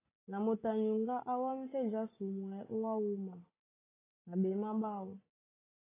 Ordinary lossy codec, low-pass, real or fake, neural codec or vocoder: AAC, 16 kbps; 3.6 kHz; real; none